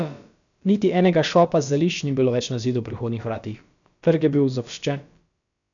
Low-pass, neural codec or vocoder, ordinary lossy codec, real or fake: 7.2 kHz; codec, 16 kHz, about 1 kbps, DyCAST, with the encoder's durations; none; fake